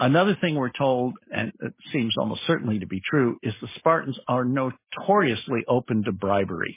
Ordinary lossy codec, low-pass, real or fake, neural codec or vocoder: MP3, 16 kbps; 3.6 kHz; real; none